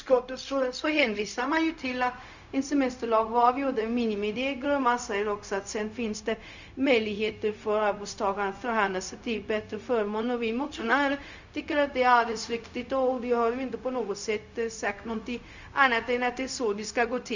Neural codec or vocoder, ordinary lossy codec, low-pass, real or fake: codec, 16 kHz, 0.4 kbps, LongCat-Audio-Codec; none; 7.2 kHz; fake